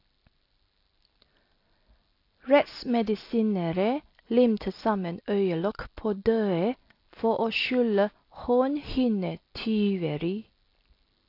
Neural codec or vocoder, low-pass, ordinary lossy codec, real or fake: none; 5.4 kHz; AAC, 32 kbps; real